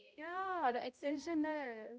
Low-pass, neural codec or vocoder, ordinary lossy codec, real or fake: none; codec, 16 kHz, 0.5 kbps, X-Codec, HuBERT features, trained on balanced general audio; none; fake